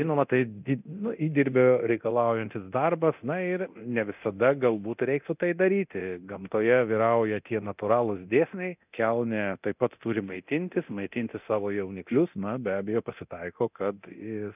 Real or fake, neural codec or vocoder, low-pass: fake; codec, 24 kHz, 0.9 kbps, DualCodec; 3.6 kHz